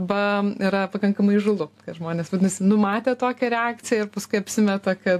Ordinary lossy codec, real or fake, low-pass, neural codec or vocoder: AAC, 64 kbps; real; 14.4 kHz; none